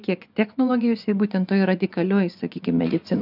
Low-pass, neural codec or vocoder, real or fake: 5.4 kHz; vocoder, 24 kHz, 100 mel bands, Vocos; fake